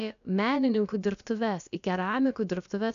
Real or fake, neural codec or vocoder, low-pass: fake; codec, 16 kHz, about 1 kbps, DyCAST, with the encoder's durations; 7.2 kHz